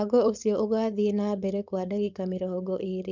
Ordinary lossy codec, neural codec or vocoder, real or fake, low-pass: none; codec, 16 kHz, 4.8 kbps, FACodec; fake; 7.2 kHz